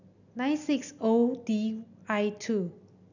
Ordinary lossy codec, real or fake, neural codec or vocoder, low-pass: none; real; none; 7.2 kHz